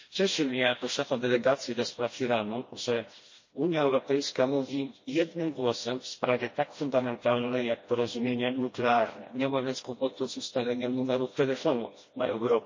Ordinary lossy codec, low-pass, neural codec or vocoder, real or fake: MP3, 32 kbps; 7.2 kHz; codec, 16 kHz, 1 kbps, FreqCodec, smaller model; fake